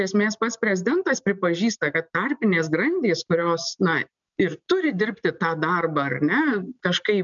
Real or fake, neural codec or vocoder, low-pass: real; none; 7.2 kHz